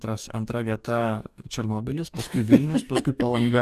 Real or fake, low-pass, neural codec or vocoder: fake; 14.4 kHz; codec, 44.1 kHz, 2.6 kbps, DAC